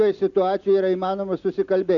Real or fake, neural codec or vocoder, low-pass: real; none; 7.2 kHz